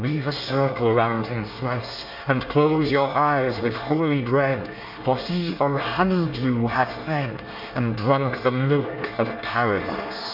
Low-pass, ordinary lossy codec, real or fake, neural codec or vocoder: 5.4 kHz; MP3, 48 kbps; fake; codec, 24 kHz, 1 kbps, SNAC